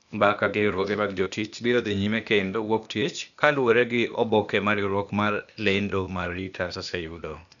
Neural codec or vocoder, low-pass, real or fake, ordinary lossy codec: codec, 16 kHz, 0.8 kbps, ZipCodec; 7.2 kHz; fake; none